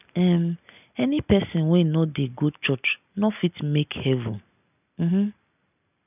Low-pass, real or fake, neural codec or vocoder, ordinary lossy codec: 3.6 kHz; real; none; none